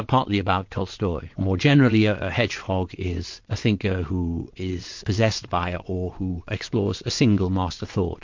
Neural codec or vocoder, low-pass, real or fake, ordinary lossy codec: vocoder, 22.05 kHz, 80 mel bands, WaveNeXt; 7.2 kHz; fake; MP3, 48 kbps